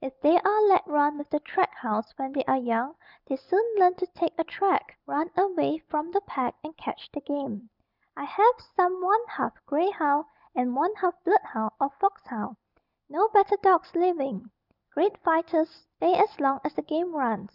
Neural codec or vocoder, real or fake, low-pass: none; real; 5.4 kHz